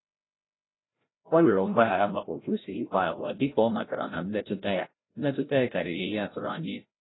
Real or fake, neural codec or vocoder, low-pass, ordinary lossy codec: fake; codec, 16 kHz, 0.5 kbps, FreqCodec, larger model; 7.2 kHz; AAC, 16 kbps